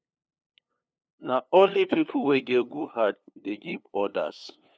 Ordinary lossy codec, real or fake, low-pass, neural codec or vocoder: none; fake; none; codec, 16 kHz, 2 kbps, FunCodec, trained on LibriTTS, 25 frames a second